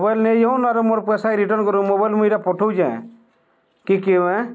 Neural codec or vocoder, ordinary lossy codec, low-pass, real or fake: none; none; none; real